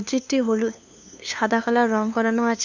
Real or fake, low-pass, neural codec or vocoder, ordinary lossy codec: fake; 7.2 kHz; codec, 16 kHz, 2 kbps, FunCodec, trained on LibriTTS, 25 frames a second; none